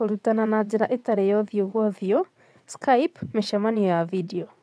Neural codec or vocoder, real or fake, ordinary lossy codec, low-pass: vocoder, 22.05 kHz, 80 mel bands, WaveNeXt; fake; none; none